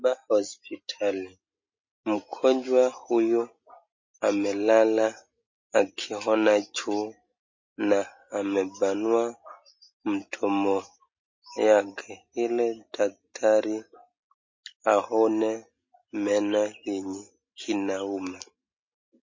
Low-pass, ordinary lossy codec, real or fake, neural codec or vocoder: 7.2 kHz; MP3, 32 kbps; real; none